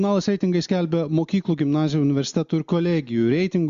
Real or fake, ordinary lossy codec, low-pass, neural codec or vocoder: real; MP3, 64 kbps; 7.2 kHz; none